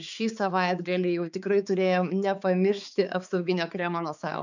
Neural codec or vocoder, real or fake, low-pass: codec, 16 kHz, 4 kbps, X-Codec, HuBERT features, trained on balanced general audio; fake; 7.2 kHz